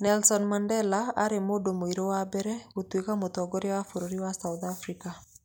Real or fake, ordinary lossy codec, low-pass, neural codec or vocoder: real; none; none; none